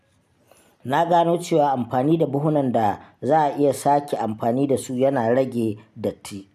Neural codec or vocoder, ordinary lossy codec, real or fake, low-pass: none; none; real; 14.4 kHz